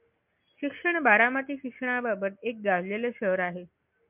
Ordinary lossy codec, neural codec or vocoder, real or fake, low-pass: MP3, 32 kbps; none; real; 3.6 kHz